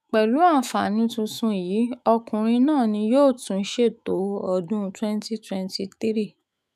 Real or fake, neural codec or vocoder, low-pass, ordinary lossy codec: fake; autoencoder, 48 kHz, 128 numbers a frame, DAC-VAE, trained on Japanese speech; 14.4 kHz; none